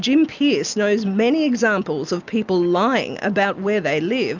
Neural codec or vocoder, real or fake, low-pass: none; real; 7.2 kHz